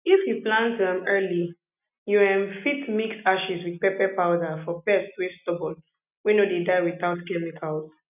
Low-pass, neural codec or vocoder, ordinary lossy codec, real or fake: 3.6 kHz; none; none; real